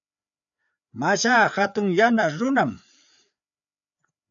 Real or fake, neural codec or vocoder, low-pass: fake; codec, 16 kHz, 4 kbps, FreqCodec, larger model; 7.2 kHz